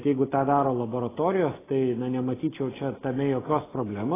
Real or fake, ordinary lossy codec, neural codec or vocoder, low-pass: real; AAC, 16 kbps; none; 3.6 kHz